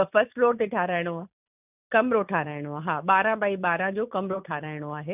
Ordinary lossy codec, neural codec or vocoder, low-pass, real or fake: none; none; 3.6 kHz; real